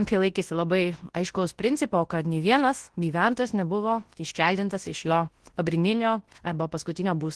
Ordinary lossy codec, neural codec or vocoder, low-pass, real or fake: Opus, 16 kbps; codec, 24 kHz, 0.9 kbps, WavTokenizer, large speech release; 10.8 kHz; fake